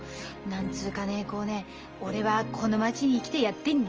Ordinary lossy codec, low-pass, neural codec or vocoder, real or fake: Opus, 24 kbps; 7.2 kHz; none; real